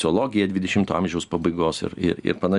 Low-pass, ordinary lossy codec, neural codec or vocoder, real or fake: 10.8 kHz; Opus, 64 kbps; none; real